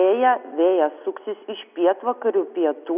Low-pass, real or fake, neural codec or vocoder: 3.6 kHz; real; none